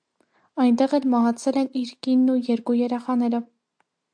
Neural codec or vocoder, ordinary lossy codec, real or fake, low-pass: none; AAC, 64 kbps; real; 9.9 kHz